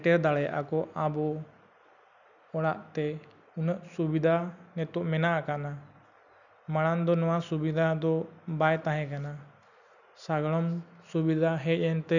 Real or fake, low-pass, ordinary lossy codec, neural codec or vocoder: real; 7.2 kHz; none; none